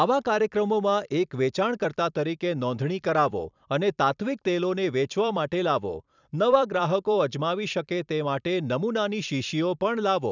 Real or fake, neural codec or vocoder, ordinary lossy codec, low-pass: fake; vocoder, 44.1 kHz, 128 mel bands every 256 samples, BigVGAN v2; none; 7.2 kHz